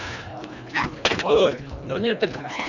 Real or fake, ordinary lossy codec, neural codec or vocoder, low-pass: fake; none; codec, 24 kHz, 1.5 kbps, HILCodec; 7.2 kHz